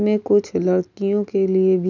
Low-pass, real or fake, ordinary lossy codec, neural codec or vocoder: 7.2 kHz; real; none; none